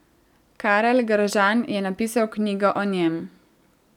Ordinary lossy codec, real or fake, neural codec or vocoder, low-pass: none; real; none; 19.8 kHz